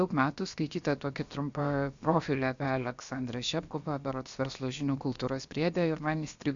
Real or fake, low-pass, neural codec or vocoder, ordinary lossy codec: fake; 7.2 kHz; codec, 16 kHz, 0.7 kbps, FocalCodec; Opus, 64 kbps